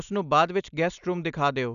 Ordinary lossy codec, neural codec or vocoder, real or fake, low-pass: none; none; real; 7.2 kHz